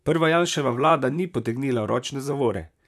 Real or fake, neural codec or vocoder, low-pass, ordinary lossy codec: fake; vocoder, 44.1 kHz, 128 mel bands, Pupu-Vocoder; 14.4 kHz; none